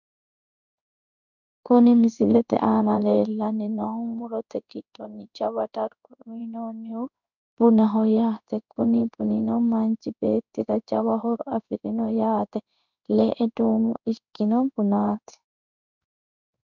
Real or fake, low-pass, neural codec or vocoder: fake; 7.2 kHz; codec, 16 kHz in and 24 kHz out, 1 kbps, XY-Tokenizer